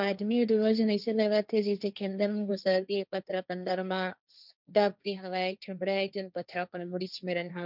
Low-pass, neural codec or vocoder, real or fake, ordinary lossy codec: 5.4 kHz; codec, 16 kHz, 1.1 kbps, Voila-Tokenizer; fake; none